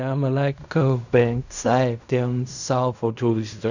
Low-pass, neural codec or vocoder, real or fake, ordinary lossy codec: 7.2 kHz; codec, 16 kHz in and 24 kHz out, 0.4 kbps, LongCat-Audio-Codec, fine tuned four codebook decoder; fake; none